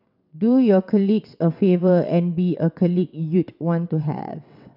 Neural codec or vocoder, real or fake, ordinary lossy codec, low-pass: vocoder, 22.05 kHz, 80 mel bands, WaveNeXt; fake; none; 5.4 kHz